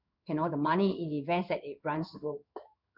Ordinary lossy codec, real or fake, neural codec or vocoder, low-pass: none; fake; codec, 16 kHz in and 24 kHz out, 1 kbps, XY-Tokenizer; 5.4 kHz